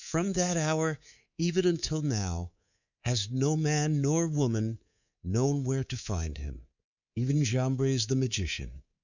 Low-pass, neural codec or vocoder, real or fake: 7.2 kHz; codec, 24 kHz, 3.1 kbps, DualCodec; fake